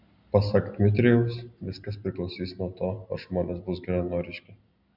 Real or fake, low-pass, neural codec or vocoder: real; 5.4 kHz; none